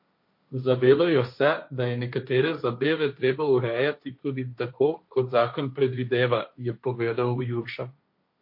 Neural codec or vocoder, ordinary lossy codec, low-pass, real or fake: codec, 16 kHz, 1.1 kbps, Voila-Tokenizer; MP3, 32 kbps; 5.4 kHz; fake